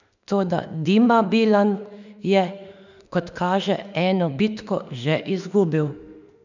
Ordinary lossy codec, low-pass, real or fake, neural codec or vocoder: none; 7.2 kHz; fake; autoencoder, 48 kHz, 32 numbers a frame, DAC-VAE, trained on Japanese speech